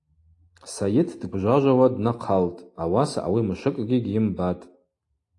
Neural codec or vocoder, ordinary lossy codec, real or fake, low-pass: none; AAC, 48 kbps; real; 10.8 kHz